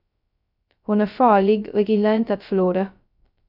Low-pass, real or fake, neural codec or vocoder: 5.4 kHz; fake; codec, 16 kHz, 0.2 kbps, FocalCodec